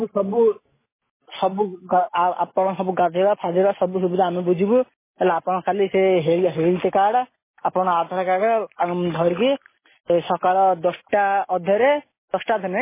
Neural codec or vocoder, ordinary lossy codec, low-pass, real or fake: none; MP3, 16 kbps; 3.6 kHz; real